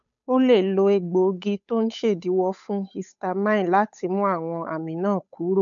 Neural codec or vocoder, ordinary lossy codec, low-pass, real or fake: codec, 16 kHz, 8 kbps, FunCodec, trained on Chinese and English, 25 frames a second; none; 7.2 kHz; fake